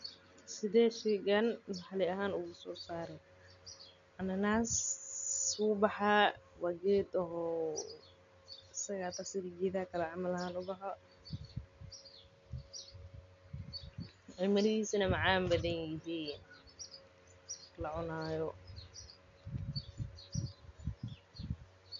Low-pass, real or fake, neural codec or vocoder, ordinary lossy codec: 7.2 kHz; real; none; none